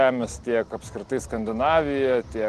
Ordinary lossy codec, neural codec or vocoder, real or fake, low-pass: Opus, 16 kbps; none; real; 10.8 kHz